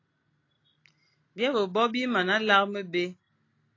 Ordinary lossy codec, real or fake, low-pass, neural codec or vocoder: AAC, 32 kbps; real; 7.2 kHz; none